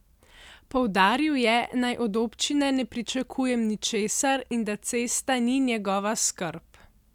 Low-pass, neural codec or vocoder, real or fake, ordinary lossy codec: 19.8 kHz; none; real; none